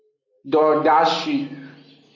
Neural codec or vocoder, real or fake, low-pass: none; real; 7.2 kHz